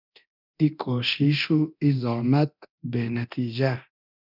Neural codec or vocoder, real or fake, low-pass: codec, 24 kHz, 0.9 kbps, DualCodec; fake; 5.4 kHz